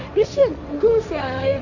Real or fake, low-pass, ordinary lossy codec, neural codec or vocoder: fake; 7.2 kHz; none; codec, 16 kHz, 1.1 kbps, Voila-Tokenizer